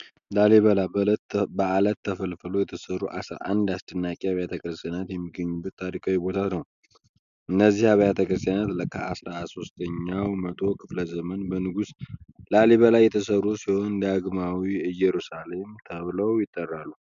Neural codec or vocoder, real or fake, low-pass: none; real; 7.2 kHz